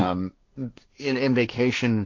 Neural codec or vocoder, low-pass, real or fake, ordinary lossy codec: codec, 16 kHz, 1.1 kbps, Voila-Tokenizer; 7.2 kHz; fake; MP3, 48 kbps